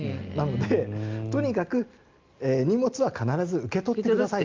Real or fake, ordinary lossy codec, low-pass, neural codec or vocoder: real; Opus, 24 kbps; 7.2 kHz; none